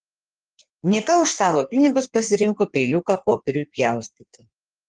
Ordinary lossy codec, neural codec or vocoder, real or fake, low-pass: Opus, 24 kbps; codec, 16 kHz in and 24 kHz out, 1.1 kbps, FireRedTTS-2 codec; fake; 9.9 kHz